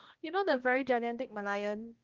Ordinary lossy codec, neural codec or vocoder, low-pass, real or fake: Opus, 16 kbps; codec, 16 kHz, 1 kbps, X-Codec, HuBERT features, trained on balanced general audio; 7.2 kHz; fake